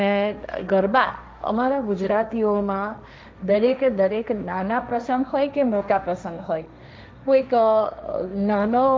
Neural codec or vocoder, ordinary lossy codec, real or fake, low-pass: codec, 16 kHz, 1.1 kbps, Voila-Tokenizer; none; fake; none